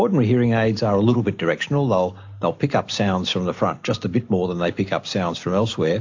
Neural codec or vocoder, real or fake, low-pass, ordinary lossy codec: none; real; 7.2 kHz; AAC, 48 kbps